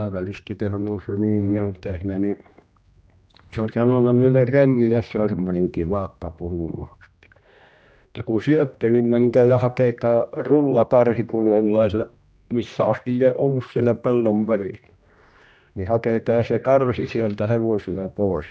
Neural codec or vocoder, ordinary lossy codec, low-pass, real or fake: codec, 16 kHz, 1 kbps, X-Codec, HuBERT features, trained on general audio; none; none; fake